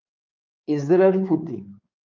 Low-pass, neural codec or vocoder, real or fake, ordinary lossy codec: 7.2 kHz; codec, 16 kHz, 4 kbps, X-Codec, WavLM features, trained on Multilingual LibriSpeech; fake; Opus, 24 kbps